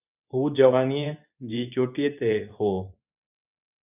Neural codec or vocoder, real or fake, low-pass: codec, 24 kHz, 0.9 kbps, WavTokenizer, medium speech release version 2; fake; 3.6 kHz